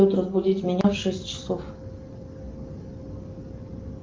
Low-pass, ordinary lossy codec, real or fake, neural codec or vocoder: 7.2 kHz; Opus, 24 kbps; real; none